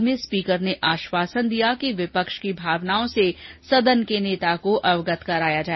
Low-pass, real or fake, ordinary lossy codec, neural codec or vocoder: 7.2 kHz; real; MP3, 24 kbps; none